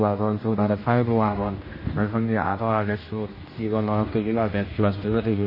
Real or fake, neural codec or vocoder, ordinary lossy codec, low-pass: fake; codec, 16 kHz, 1 kbps, X-Codec, HuBERT features, trained on general audio; MP3, 24 kbps; 5.4 kHz